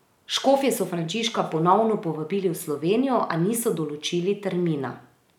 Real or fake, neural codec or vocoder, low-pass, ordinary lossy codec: real; none; 19.8 kHz; none